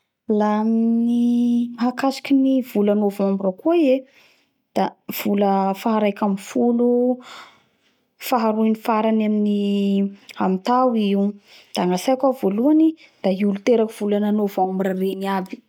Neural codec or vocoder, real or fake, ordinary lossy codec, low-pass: none; real; none; 19.8 kHz